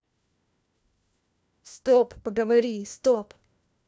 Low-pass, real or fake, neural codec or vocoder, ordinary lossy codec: none; fake; codec, 16 kHz, 1 kbps, FunCodec, trained on LibriTTS, 50 frames a second; none